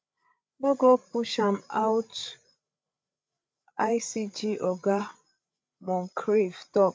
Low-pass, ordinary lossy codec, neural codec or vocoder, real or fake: none; none; codec, 16 kHz, 8 kbps, FreqCodec, larger model; fake